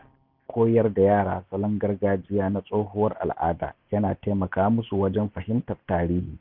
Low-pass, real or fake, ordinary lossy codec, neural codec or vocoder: 5.4 kHz; real; none; none